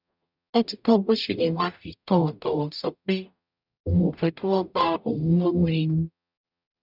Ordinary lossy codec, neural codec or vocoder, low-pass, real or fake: none; codec, 44.1 kHz, 0.9 kbps, DAC; 5.4 kHz; fake